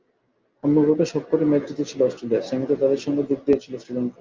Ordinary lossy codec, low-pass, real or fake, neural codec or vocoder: Opus, 24 kbps; 7.2 kHz; real; none